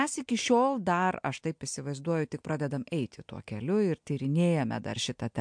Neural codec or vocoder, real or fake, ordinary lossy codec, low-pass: none; real; MP3, 64 kbps; 9.9 kHz